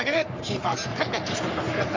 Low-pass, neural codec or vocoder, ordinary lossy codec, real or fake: 7.2 kHz; codec, 44.1 kHz, 3.4 kbps, Pupu-Codec; MP3, 64 kbps; fake